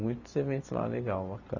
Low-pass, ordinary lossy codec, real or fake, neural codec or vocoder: 7.2 kHz; none; real; none